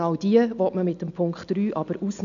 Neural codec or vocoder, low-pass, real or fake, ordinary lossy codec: none; 7.2 kHz; real; none